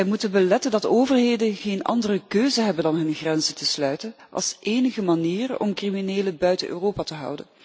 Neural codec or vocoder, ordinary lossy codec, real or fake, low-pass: none; none; real; none